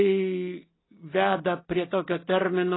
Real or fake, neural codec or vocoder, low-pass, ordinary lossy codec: real; none; 7.2 kHz; AAC, 16 kbps